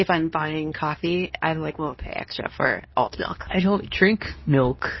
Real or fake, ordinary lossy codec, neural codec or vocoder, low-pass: fake; MP3, 24 kbps; codec, 24 kHz, 0.9 kbps, WavTokenizer, medium speech release version 2; 7.2 kHz